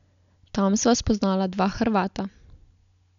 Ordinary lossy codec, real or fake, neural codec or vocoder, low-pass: none; real; none; 7.2 kHz